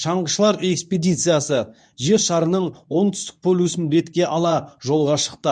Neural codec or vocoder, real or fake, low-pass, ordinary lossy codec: codec, 24 kHz, 0.9 kbps, WavTokenizer, medium speech release version 2; fake; 9.9 kHz; none